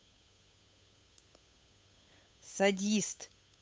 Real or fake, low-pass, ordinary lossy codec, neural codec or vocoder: fake; none; none; codec, 16 kHz, 8 kbps, FunCodec, trained on Chinese and English, 25 frames a second